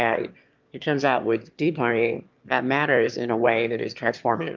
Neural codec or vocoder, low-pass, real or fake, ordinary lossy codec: autoencoder, 22.05 kHz, a latent of 192 numbers a frame, VITS, trained on one speaker; 7.2 kHz; fake; Opus, 24 kbps